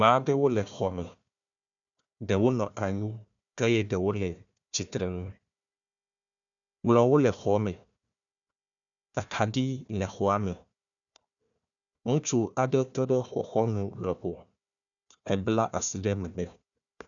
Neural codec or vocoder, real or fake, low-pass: codec, 16 kHz, 1 kbps, FunCodec, trained on Chinese and English, 50 frames a second; fake; 7.2 kHz